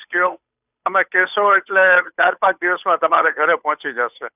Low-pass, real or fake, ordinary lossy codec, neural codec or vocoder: 3.6 kHz; fake; none; codec, 16 kHz, 8 kbps, FunCodec, trained on Chinese and English, 25 frames a second